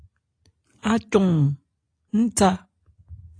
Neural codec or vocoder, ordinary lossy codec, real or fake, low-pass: none; AAC, 32 kbps; real; 9.9 kHz